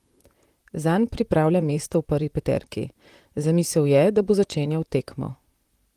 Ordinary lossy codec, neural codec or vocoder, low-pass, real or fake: Opus, 24 kbps; vocoder, 44.1 kHz, 128 mel bands, Pupu-Vocoder; 14.4 kHz; fake